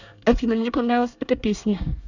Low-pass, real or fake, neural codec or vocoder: 7.2 kHz; fake; codec, 24 kHz, 1 kbps, SNAC